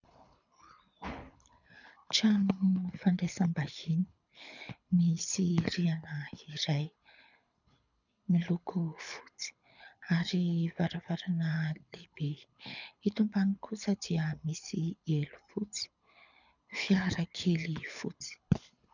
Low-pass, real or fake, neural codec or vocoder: 7.2 kHz; fake; codec, 24 kHz, 6 kbps, HILCodec